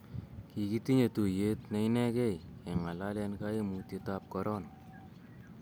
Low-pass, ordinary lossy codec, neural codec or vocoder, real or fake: none; none; none; real